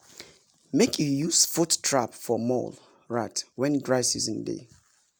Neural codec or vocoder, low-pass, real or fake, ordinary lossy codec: none; none; real; none